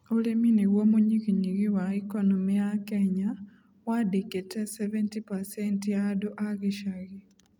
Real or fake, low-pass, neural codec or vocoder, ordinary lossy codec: real; 19.8 kHz; none; none